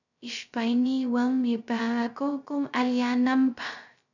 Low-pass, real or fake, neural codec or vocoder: 7.2 kHz; fake; codec, 16 kHz, 0.2 kbps, FocalCodec